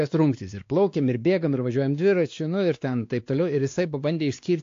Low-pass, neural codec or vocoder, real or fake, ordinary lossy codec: 7.2 kHz; codec, 16 kHz, 2 kbps, X-Codec, WavLM features, trained on Multilingual LibriSpeech; fake; AAC, 48 kbps